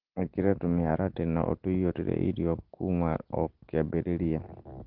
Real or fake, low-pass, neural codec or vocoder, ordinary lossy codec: real; 5.4 kHz; none; Opus, 16 kbps